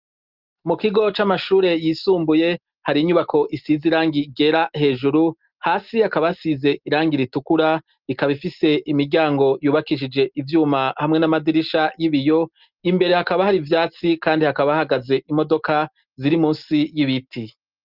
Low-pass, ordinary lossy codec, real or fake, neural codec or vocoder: 5.4 kHz; Opus, 32 kbps; real; none